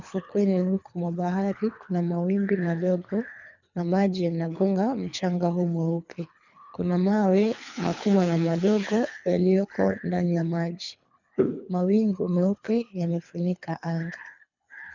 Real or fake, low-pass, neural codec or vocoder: fake; 7.2 kHz; codec, 24 kHz, 3 kbps, HILCodec